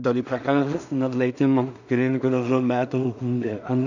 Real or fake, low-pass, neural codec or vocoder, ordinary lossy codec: fake; 7.2 kHz; codec, 16 kHz in and 24 kHz out, 0.4 kbps, LongCat-Audio-Codec, two codebook decoder; none